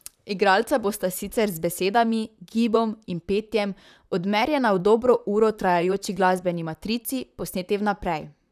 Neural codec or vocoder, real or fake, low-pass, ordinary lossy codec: vocoder, 44.1 kHz, 128 mel bands, Pupu-Vocoder; fake; 14.4 kHz; none